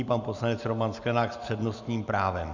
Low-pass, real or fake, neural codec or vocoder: 7.2 kHz; real; none